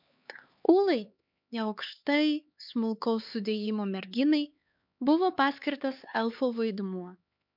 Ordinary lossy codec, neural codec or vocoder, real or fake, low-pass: MP3, 48 kbps; codec, 16 kHz, 4 kbps, X-Codec, HuBERT features, trained on LibriSpeech; fake; 5.4 kHz